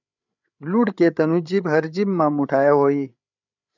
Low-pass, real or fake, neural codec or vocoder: 7.2 kHz; fake; codec, 16 kHz, 8 kbps, FreqCodec, larger model